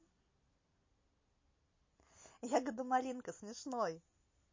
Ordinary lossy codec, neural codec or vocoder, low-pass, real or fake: MP3, 32 kbps; none; 7.2 kHz; real